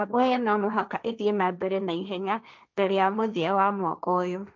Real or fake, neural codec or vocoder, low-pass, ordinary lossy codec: fake; codec, 16 kHz, 1.1 kbps, Voila-Tokenizer; none; none